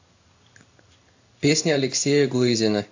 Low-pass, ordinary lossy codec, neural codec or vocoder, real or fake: 7.2 kHz; AAC, 48 kbps; codec, 16 kHz in and 24 kHz out, 1 kbps, XY-Tokenizer; fake